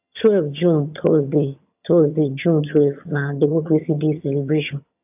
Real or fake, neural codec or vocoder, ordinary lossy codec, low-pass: fake; vocoder, 22.05 kHz, 80 mel bands, HiFi-GAN; AAC, 32 kbps; 3.6 kHz